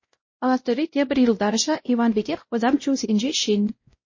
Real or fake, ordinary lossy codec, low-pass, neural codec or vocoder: fake; MP3, 32 kbps; 7.2 kHz; codec, 16 kHz, 0.5 kbps, X-Codec, WavLM features, trained on Multilingual LibriSpeech